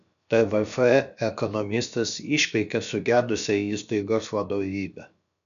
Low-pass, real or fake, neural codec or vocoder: 7.2 kHz; fake; codec, 16 kHz, about 1 kbps, DyCAST, with the encoder's durations